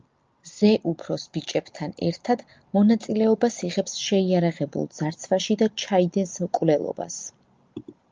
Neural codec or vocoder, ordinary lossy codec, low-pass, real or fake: none; Opus, 24 kbps; 7.2 kHz; real